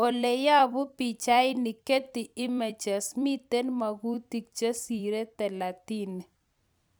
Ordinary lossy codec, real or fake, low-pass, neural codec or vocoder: none; fake; none; vocoder, 44.1 kHz, 128 mel bands every 256 samples, BigVGAN v2